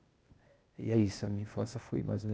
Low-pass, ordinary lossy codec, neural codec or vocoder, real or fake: none; none; codec, 16 kHz, 0.8 kbps, ZipCodec; fake